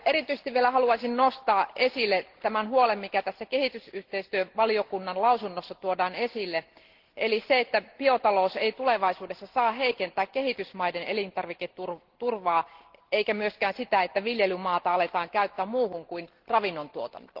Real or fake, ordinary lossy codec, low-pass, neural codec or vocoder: real; Opus, 16 kbps; 5.4 kHz; none